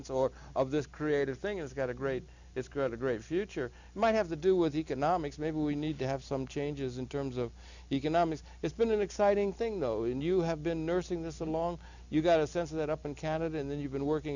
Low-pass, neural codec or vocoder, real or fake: 7.2 kHz; none; real